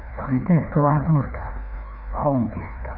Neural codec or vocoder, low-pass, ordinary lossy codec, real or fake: codec, 16 kHz, 2 kbps, FreqCodec, larger model; 5.4 kHz; none; fake